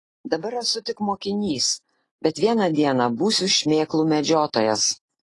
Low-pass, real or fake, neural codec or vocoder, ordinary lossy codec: 10.8 kHz; real; none; AAC, 32 kbps